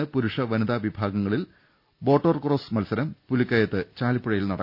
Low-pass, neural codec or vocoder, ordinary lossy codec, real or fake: 5.4 kHz; none; none; real